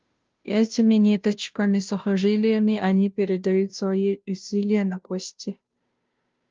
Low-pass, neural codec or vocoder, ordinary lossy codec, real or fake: 7.2 kHz; codec, 16 kHz, 0.5 kbps, FunCodec, trained on Chinese and English, 25 frames a second; Opus, 32 kbps; fake